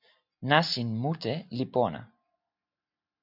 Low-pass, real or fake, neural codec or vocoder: 5.4 kHz; real; none